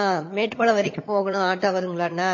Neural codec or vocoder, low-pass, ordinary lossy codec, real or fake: vocoder, 22.05 kHz, 80 mel bands, HiFi-GAN; 7.2 kHz; MP3, 32 kbps; fake